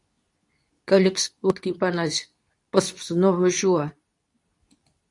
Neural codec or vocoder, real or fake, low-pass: codec, 24 kHz, 0.9 kbps, WavTokenizer, medium speech release version 2; fake; 10.8 kHz